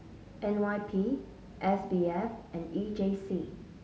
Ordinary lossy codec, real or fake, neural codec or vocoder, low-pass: none; real; none; none